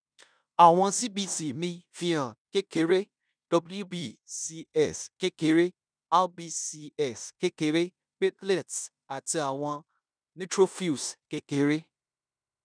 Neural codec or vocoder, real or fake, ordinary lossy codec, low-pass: codec, 16 kHz in and 24 kHz out, 0.9 kbps, LongCat-Audio-Codec, fine tuned four codebook decoder; fake; none; 9.9 kHz